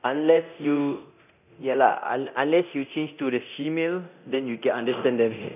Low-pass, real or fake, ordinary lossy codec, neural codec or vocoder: 3.6 kHz; fake; none; codec, 24 kHz, 0.9 kbps, DualCodec